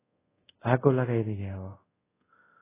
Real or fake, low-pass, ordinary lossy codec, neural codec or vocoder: fake; 3.6 kHz; AAC, 16 kbps; codec, 24 kHz, 0.5 kbps, DualCodec